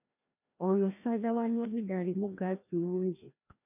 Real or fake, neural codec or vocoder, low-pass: fake; codec, 16 kHz, 1 kbps, FreqCodec, larger model; 3.6 kHz